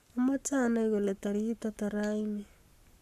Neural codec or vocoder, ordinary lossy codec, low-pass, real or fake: codec, 44.1 kHz, 7.8 kbps, Pupu-Codec; AAC, 96 kbps; 14.4 kHz; fake